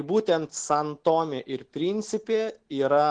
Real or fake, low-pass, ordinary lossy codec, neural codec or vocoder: real; 9.9 kHz; Opus, 16 kbps; none